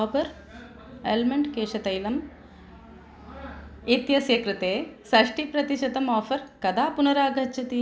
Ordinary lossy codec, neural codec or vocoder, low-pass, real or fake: none; none; none; real